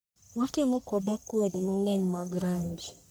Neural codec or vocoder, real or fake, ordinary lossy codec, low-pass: codec, 44.1 kHz, 1.7 kbps, Pupu-Codec; fake; none; none